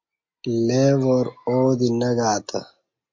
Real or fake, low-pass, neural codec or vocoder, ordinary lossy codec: real; 7.2 kHz; none; MP3, 48 kbps